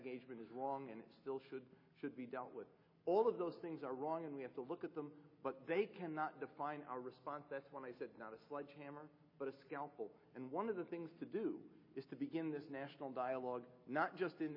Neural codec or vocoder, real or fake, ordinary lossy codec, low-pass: none; real; MP3, 24 kbps; 5.4 kHz